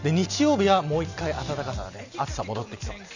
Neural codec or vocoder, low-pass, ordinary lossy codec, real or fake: none; 7.2 kHz; none; real